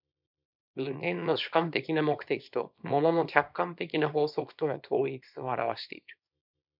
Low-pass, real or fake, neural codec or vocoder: 5.4 kHz; fake; codec, 24 kHz, 0.9 kbps, WavTokenizer, small release